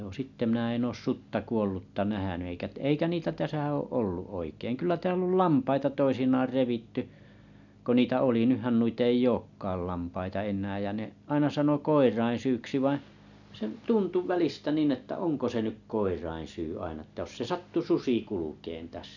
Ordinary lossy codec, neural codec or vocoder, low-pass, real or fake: none; none; 7.2 kHz; real